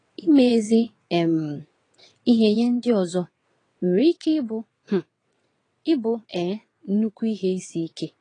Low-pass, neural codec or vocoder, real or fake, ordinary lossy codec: 9.9 kHz; vocoder, 22.05 kHz, 80 mel bands, WaveNeXt; fake; AAC, 32 kbps